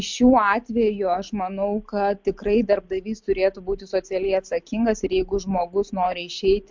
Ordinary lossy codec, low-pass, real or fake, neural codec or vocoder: MP3, 64 kbps; 7.2 kHz; real; none